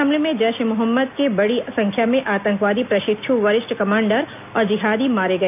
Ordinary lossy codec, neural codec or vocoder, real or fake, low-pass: none; none; real; 3.6 kHz